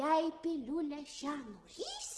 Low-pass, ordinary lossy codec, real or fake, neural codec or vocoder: 10.8 kHz; Opus, 16 kbps; real; none